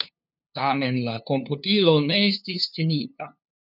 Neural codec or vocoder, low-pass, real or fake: codec, 16 kHz, 2 kbps, FunCodec, trained on LibriTTS, 25 frames a second; 5.4 kHz; fake